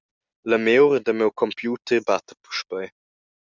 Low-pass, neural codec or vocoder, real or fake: 7.2 kHz; none; real